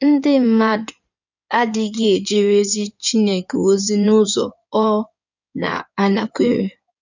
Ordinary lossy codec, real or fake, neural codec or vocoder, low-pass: none; fake; codec, 16 kHz in and 24 kHz out, 2.2 kbps, FireRedTTS-2 codec; 7.2 kHz